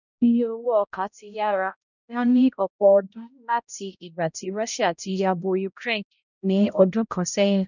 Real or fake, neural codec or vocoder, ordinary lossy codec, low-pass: fake; codec, 16 kHz, 0.5 kbps, X-Codec, HuBERT features, trained on balanced general audio; none; 7.2 kHz